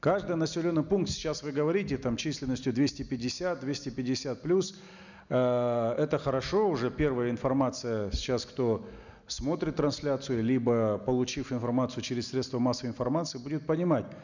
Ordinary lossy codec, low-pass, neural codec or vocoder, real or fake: none; 7.2 kHz; none; real